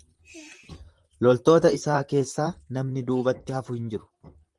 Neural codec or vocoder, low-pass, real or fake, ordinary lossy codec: vocoder, 44.1 kHz, 128 mel bands, Pupu-Vocoder; 10.8 kHz; fake; Opus, 32 kbps